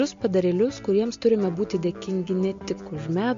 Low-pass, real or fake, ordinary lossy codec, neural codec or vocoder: 7.2 kHz; real; MP3, 48 kbps; none